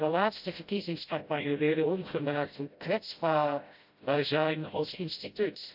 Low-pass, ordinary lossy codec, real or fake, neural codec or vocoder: 5.4 kHz; AAC, 48 kbps; fake; codec, 16 kHz, 0.5 kbps, FreqCodec, smaller model